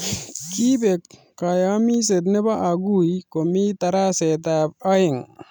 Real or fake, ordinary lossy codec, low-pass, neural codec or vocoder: real; none; none; none